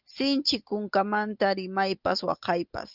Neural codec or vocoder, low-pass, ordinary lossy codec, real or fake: none; 5.4 kHz; Opus, 24 kbps; real